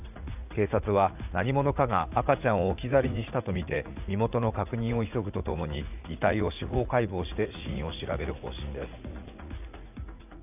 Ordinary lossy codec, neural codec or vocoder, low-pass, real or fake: none; vocoder, 44.1 kHz, 80 mel bands, Vocos; 3.6 kHz; fake